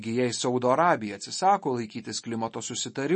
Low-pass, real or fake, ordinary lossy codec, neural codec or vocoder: 10.8 kHz; real; MP3, 32 kbps; none